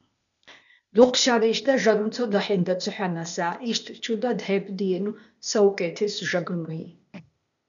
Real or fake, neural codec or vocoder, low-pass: fake; codec, 16 kHz, 0.8 kbps, ZipCodec; 7.2 kHz